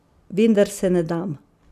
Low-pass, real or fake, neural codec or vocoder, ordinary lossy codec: 14.4 kHz; real; none; none